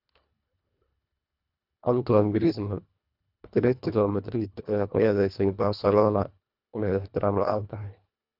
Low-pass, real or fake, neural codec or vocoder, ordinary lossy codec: 5.4 kHz; fake; codec, 24 kHz, 1.5 kbps, HILCodec; none